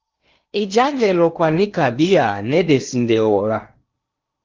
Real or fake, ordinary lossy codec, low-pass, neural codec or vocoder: fake; Opus, 32 kbps; 7.2 kHz; codec, 16 kHz in and 24 kHz out, 0.8 kbps, FocalCodec, streaming, 65536 codes